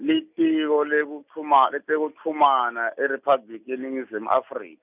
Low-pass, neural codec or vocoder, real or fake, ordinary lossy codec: 3.6 kHz; none; real; none